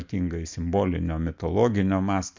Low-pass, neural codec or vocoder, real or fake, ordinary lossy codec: 7.2 kHz; none; real; MP3, 64 kbps